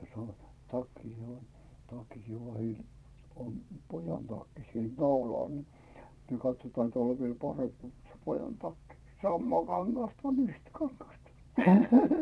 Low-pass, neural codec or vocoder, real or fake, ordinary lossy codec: none; vocoder, 22.05 kHz, 80 mel bands, Vocos; fake; none